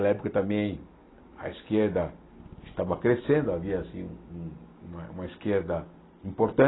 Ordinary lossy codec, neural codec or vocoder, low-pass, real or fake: AAC, 16 kbps; none; 7.2 kHz; real